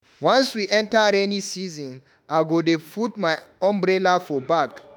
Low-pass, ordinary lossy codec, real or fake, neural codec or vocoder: none; none; fake; autoencoder, 48 kHz, 32 numbers a frame, DAC-VAE, trained on Japanese speech